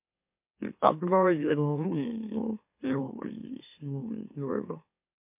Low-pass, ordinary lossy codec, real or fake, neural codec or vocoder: 3.6 kHz; MP3, 32 kbps; fake; autoencoder, 44.1 kHz, a latent of 192 numbers a frame, MeloTTS